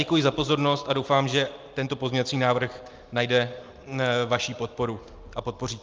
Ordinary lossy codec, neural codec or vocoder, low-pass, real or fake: Opus, 24 kbps; none; 7.2 kHz; real